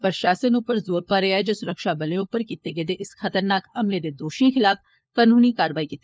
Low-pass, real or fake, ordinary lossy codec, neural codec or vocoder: none; fake; none; codec, 16 kHz, 4 kbps, FunCodec, trained on LibriTTS, 50 frames a second